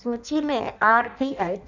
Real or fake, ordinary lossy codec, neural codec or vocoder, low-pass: fake; none; codec, 16 kHz in and 24 kHz out, 0.6 kbps, FireRedTTS-2 codec; 7.2 kHz